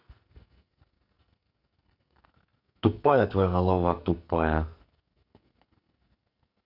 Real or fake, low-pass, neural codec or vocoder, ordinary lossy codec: fake; 5.4 kHz; codec, 44.1 kHz, 2.6 kbps, SNAC; none